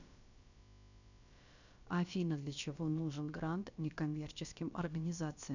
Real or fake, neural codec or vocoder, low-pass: fake; codec, 16 kHz, about 1 kbps, DyCAST, with the encoder's durations; 7.2 kHz